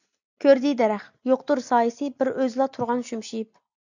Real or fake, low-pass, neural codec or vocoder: real; 7.2 kHz; none